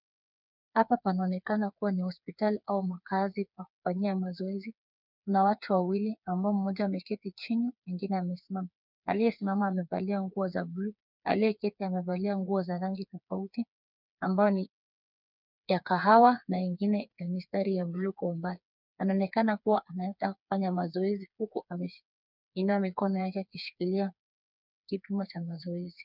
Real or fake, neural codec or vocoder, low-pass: fake; codec, 16 kHz, 4 kbps, FreqCodec, smaller model; 5.4 kHz